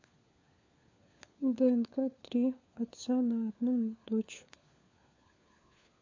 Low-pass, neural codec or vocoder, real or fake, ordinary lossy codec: 7.2 kHz; codec, 16 kHz, 4 kbps, FunCodec, trained on LibriTTS, 50 frames a second; fake; MP3, 48 kbps